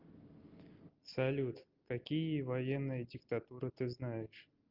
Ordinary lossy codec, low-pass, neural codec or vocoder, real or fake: Opus, 24 kbps; 5.4 kHz; none; real